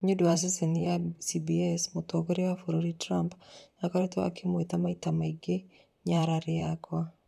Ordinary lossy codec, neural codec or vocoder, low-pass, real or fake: none; vocoder, 44.1 kHz, 128 mel bands, Pupu-Vocoder; 14.4 kHz; fake